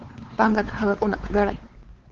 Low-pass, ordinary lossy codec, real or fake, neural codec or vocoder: 7.2 kHz; Opus, 16 kbps; fake; codec, 16 kHz, 4 kbps, X-Codec, WavLM features, trained on Multilingual LibriSpeech